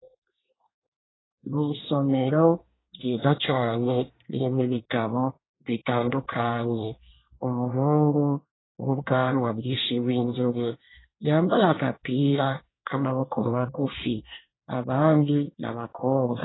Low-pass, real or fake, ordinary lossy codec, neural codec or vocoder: 7.2 kHz; fake; AAC, 16 kbps; codec, 24 kHz, 1 kbps, SNAC